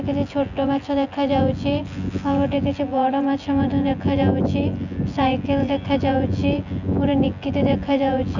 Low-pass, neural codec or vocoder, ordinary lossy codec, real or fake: 7.2 kHz; vocoder, 24 kHz, 100 mel bands, Vocos; none; fake